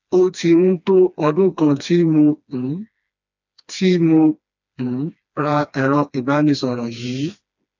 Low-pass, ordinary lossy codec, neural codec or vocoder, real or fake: 7.2 kHz; none; codec, 16 kHz, 2 kbps, FreqCodec, smaller model; fake